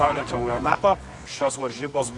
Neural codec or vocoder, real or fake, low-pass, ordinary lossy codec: codec, 24 kHz, 0.9 kbps, WavTokenizer, medium music audio release; fake; 10.8 kHz; AAC, 64 kbps